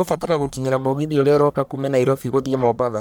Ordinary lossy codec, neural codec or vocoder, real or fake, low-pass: none; codec, 44.1 kHz, 1.7 kbps, Pupu-Codec; fake; none